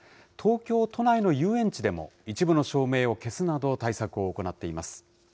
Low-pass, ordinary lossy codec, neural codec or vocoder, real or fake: none; none; none; real